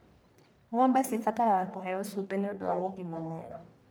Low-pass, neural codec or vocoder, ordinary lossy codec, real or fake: none; codec, 44.1 kHz, 1.7 kbps, Pupu-Codec; none; fake